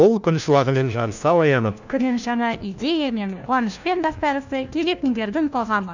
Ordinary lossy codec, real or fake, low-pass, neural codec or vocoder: none; fake; 7.2 kHz; codec, 16 kHz, 1 kbps, FunCodec, trained on LibriTTS, 50 frames a second